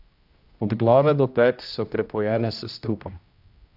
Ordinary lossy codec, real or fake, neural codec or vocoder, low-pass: AAC, 48 kbps; fake; codec, 16 kHz, 1 kbps, X-Codec, HuBERT features, trained on general audio; 5.4 kHz